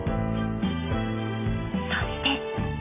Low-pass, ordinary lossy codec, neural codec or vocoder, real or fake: 3.6 kHz; none; none; real